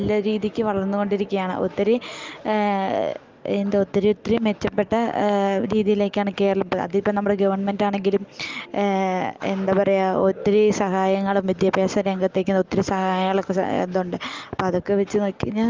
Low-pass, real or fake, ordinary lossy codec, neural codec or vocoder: 7.2 kHz; real; Opus, 32 kbps; none